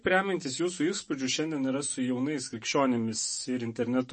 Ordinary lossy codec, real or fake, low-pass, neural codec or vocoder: MP3, 32 kbps; fake; 10.8 kHz; autoencoder, 48 kHz, 128 numbers a frame, DAC-VAE, trained on Japanese speech